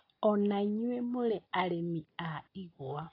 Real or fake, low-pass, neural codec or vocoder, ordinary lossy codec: real; 5.4 kHz; none; AAC, 24 kbps